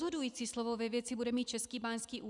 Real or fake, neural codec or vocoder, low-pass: real; none; 10.8 kHz